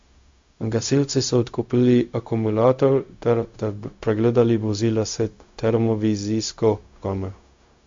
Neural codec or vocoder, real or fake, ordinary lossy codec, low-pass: codec, 16 kHz, 0.4 kbps, LongCat-Audio-Codec; fake; MP3, 48 kbps; 7.2 kHz